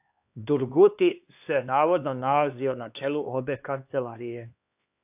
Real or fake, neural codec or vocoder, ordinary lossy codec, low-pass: fake; codec, 16 kHz, 2 kbps, X-Codec, HuBERT features, trained on LibriSpeech; AAC, 32 kbps; 3.6 kHz